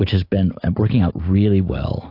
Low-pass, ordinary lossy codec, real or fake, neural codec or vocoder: 5.4 kHz; AAC, 32 kbps; real; none